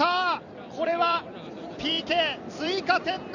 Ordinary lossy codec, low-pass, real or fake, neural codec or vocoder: none; 7.2 kHz; fake; vocoder, 44.1 kHz, 128 mel bands every 256 samples, BigVGAN v2